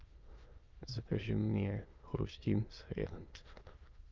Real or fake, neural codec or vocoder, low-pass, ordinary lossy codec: fake; autoencoder, 22.05 kHz, a latent of 192 numbers a frame, VITS, trained on many speakers; 7.2 kHz; Opus, 24 kbps